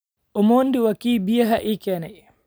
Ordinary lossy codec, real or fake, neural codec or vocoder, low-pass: none; real; none; none